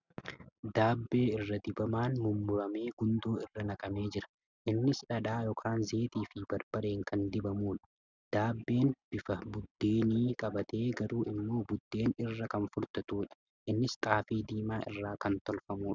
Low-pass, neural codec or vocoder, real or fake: 7.2 kHz; none; real